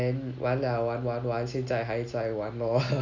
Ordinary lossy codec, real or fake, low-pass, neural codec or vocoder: Opus, 64 kbps; real; 7.2 kHz; none